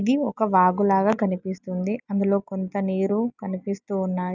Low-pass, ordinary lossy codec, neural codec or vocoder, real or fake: 7.2 kHz; none; none; real